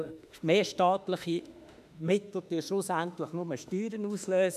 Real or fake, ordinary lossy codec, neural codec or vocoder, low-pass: fake; none; autoencoder, 48 kHz, 32 numbers a frame, DAC-VAE, trained on Japanese speech; 14.4 kHz